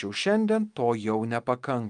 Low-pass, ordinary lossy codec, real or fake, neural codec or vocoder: 9.9 kHz; Opus, 32 kbps; real; none